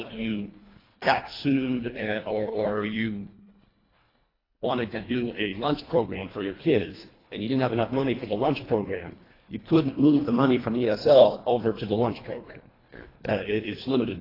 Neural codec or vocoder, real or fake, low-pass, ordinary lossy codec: codec, 24 kHz, 1.5 kbps, HILCodec; fake; 5.4 kHz; AAC, 24 kbps